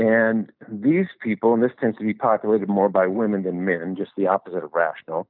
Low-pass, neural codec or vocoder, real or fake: 5.4 kHz; none; real